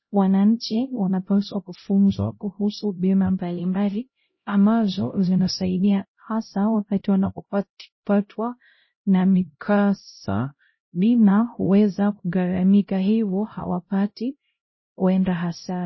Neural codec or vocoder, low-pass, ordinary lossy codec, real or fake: codec, 16 kHz, 0.5 kbps, X-Codec, HuBERT features, trained on LibriSpeech; 7.2 kHz; MP3, 24 kbps; fake